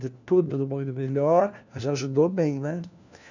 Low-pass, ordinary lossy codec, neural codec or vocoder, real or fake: 7.2 kHz; none; codec, 16 kHz, 1 kbps, FunCodec, trained on LibriTTS, 50 frames a second; fake